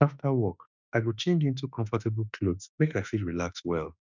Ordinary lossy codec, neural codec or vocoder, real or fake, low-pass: none; autoencoder, 48 kHz, 32 numbers a frame, DAC-VAE, trained on Japanese speech; fake; 7.2 kHz